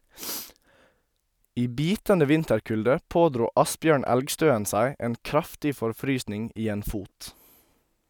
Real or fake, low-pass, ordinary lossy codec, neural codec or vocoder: real; none; none; none